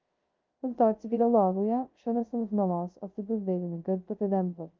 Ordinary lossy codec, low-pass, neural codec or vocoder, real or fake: Opus, 32 kbps; 7.2 kHz; codec, 16 kHz, 0.2 kbps, FocalCodec; fake